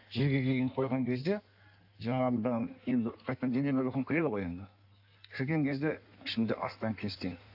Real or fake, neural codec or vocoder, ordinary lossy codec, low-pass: fake; codec, 16 kHz in and 24 kHz out, 1.1 kbps, FireRedTTS-2 codec; AAC, 48 kbps; 5.4 kHz